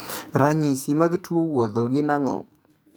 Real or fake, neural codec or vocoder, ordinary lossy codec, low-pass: fake; codec, 44.1 kHz, 2.6 kbps, SNAC; none; none